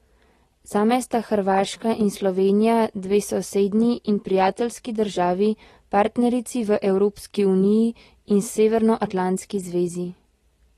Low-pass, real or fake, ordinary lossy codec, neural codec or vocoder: 19.8 kHz; real; AAC, 32 kbps; none